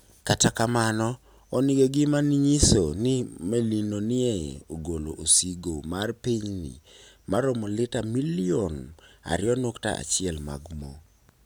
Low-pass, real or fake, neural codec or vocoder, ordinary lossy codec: none; real; none; none